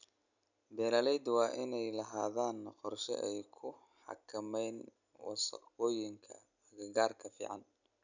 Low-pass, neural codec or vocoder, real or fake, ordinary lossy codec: 7.2 kHz; none; real; none